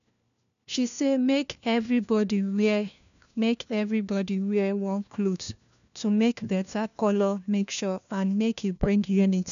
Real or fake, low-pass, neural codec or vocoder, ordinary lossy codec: fake; 7.2 kHz; codec, 16 kHz, 1 kbps, FunCodec, trained on LibriTTS, 50 frames a second; none